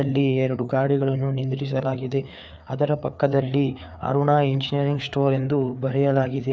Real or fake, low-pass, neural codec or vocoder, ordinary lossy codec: fake; none; codec, 16 kHz, 4 kbps, FreqCodec, larger model; none